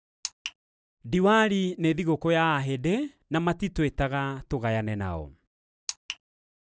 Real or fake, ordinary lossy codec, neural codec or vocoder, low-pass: real; none; none; none